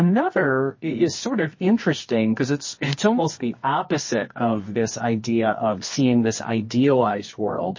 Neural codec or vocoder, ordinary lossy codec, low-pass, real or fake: codec, 24 kHz, 0.9 kbps, WavTokenizer, medium music audio release; MP3, 32 kbps; 7.2 kHz; fake